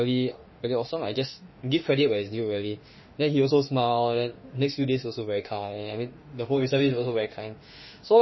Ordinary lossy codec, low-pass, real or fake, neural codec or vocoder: MP3, 24 kbps; 7.2 kHz; fake; autoencoder, 48 kHz, 32 numbers a frame, DAC-VAE, trained on Japanese speech